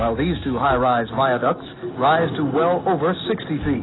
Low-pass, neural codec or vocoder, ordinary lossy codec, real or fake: 7.2 kHz; none; AAC, 16 kbps; real